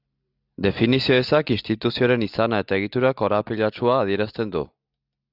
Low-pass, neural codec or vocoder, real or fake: 5.4 kHz; none; real